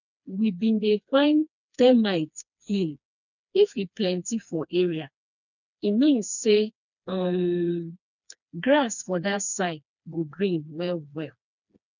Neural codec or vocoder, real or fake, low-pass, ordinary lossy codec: codec, 16 kHz, 2 kbps, FreqCodec, smaller model; fake; 7.2 kHz; none